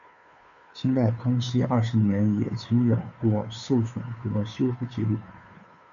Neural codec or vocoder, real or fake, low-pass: codec, 16 kHz, 2 kbps, FunCodec, trained on Chinese and English, 25 frames a second; fake; 7.2 kHz